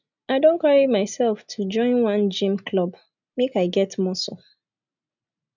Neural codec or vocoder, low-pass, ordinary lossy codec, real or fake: none; 7.2 kHz; none; real